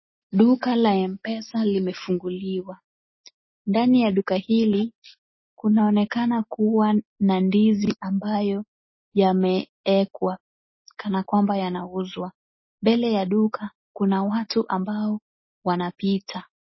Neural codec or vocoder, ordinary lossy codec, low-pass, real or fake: none; MP3, 24 kbps; 7.2 kHz; real